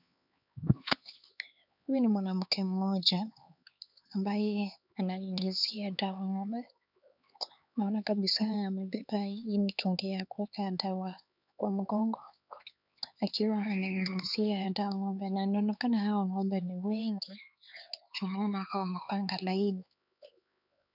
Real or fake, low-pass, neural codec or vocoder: fake; 5.4 kHz; codec, 16 kHz, 4 kbps, X-Codec, HuBERT features, trained on LibriSpeech